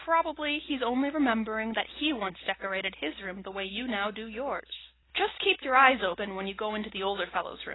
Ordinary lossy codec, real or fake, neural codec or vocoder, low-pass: AAC, 16 kbps; real; none; 7.2 kHz